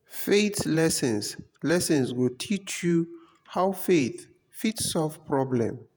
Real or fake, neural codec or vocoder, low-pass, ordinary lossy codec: fake; vocoder, 48 kHz, 128 mel bands, Vocos; none; none